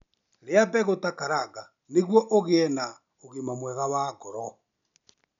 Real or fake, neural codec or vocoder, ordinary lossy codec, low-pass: real; none; MP3, 96 kbps; 7.2 kHz